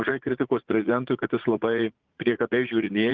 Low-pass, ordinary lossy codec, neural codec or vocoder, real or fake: 7.2 kHz; Opus, 32 kbps; vocoder, 44.1 kHz, 80 mel bands, Vocos; fake